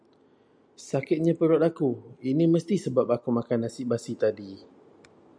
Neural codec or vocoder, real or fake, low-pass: none; real; 9.9 kHz